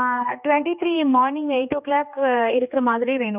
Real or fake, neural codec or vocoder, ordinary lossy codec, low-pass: fake; codec, 16 kHz, 2 kbps, X-Codec, HuBERT features, trained on general audio; none; 3.6 kHz